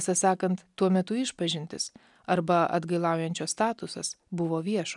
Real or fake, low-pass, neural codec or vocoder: real; 10.8 kHz; none